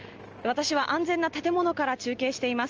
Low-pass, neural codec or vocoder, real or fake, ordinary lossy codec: 7.2 kHz; none; real; Opus, 24 kbps